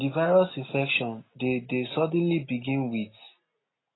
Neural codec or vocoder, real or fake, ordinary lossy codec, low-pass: none; real; AAC, 16 kbps; 7.2 kHz